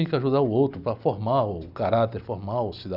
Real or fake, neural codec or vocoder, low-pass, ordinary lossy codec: real; none; 5.4 kHz; Opus, 64 kbps